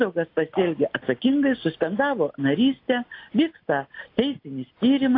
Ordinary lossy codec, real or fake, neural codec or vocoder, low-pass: AAC, 32 kbps; real; none; 5.4 kHz